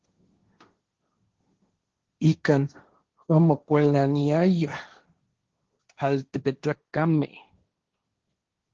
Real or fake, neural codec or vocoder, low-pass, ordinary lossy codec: fake; codec, 16 kHz, 1.1 kbps, Voila-Tokenizer; 7.2 kHz; Opus, 16 kbps